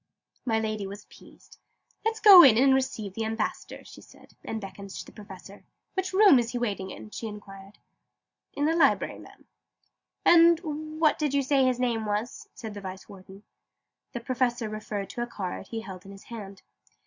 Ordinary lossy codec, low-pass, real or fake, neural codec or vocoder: Opus, 64 kbps; 7.2 kHz; real; none